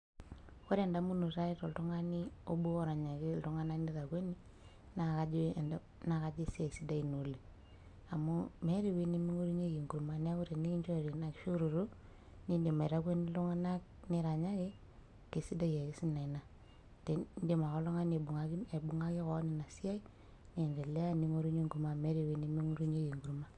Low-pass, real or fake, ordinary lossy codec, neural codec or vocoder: 10.8 kHz; real; none; none